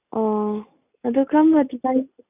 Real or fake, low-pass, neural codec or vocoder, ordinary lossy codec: real; 3.6 kHz; none; none